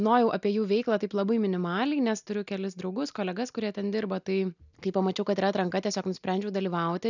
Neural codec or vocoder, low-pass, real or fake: none; 7.2 kHz; real